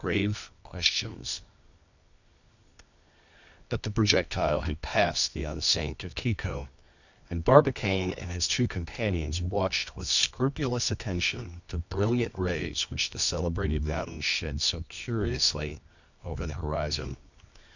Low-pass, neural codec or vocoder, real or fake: 7.2 kHz; codec, 24 kHz, 0.9 kbps, WavTokenizer, medium music audio release; fake